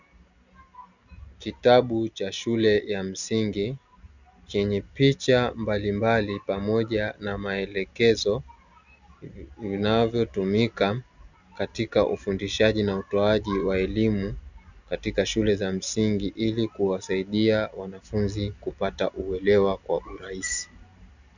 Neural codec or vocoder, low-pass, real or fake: none; 7.2 kHz; real